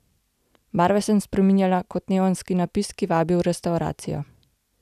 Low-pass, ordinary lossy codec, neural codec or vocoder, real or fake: 14.4 kHz; none; none; real